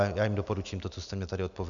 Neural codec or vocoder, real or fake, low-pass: none; real; 7.2 kHz